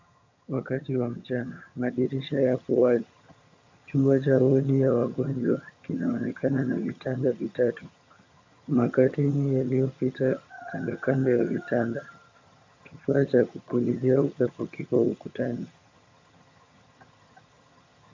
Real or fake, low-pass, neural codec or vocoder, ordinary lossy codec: fake; 7.2 kHz; vocoder, 22.05 kHz, 80 mel bands, HiFi-GAN; MP3, 64 kbps